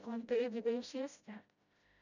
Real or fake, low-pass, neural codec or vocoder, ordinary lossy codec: fake; 7.2 kHz; codec, 16 kHz, 0.5 kbps, FreqCodec, smaller model; none